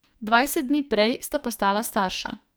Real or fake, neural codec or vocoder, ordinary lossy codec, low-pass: fake; codec, 44.1 kHz, 2.6 kbps, SNAC; none; none